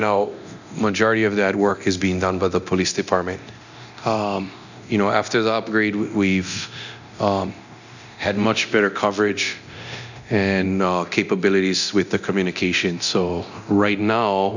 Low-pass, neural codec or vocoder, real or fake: 7.2 kHz; codec, 24 kHz, 0.9 kbps, DualCodec; fake